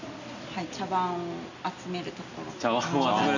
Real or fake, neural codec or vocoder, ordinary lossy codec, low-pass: real; none; none; 7.2 kHz